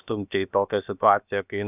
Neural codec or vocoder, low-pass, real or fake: codec, 16 kHz, about 1 kbps, DyCAST, with the encoder's durations; 3.6 kHz; fake